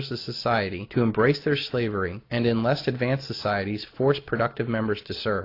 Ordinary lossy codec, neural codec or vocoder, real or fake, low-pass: AAC, 32 kbps; none; real; 5.4 kHz